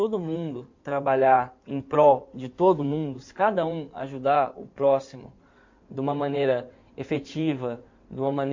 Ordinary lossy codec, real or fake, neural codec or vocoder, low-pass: MP3, 48 kbps; fake; codec, 16 kHz in and 24 kHz out, 2.2 kbps, FireRedTTS-2 codec; 7.2 kHz